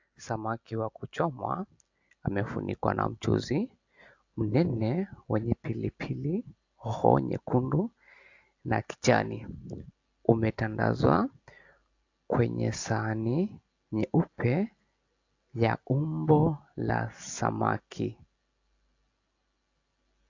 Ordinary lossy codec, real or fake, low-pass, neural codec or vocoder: AAC, 48 kbps; real; 7.2 kHz; none